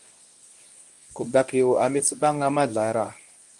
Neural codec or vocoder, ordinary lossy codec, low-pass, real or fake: codec, 24 kHz, 0.9 kbps, WavTokenizer, medium speech release version 1; Opus, 32 kbps; 10.8 kHz; fake